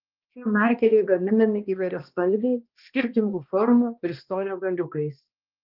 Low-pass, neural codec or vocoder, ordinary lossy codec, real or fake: 5.4 kHz; codec, 16 kHz, 1 kbps, X-Codec, HuBERT features, trained on balanced general audio; Opus, 32 kbps; fake